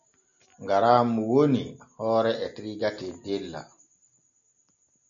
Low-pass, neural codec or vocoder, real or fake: 7.2 kHz; none; real